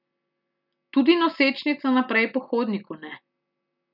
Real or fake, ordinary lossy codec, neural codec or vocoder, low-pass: real; none; none; 5.4 kHz